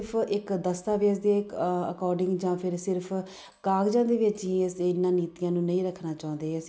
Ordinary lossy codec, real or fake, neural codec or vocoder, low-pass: none; real; none; none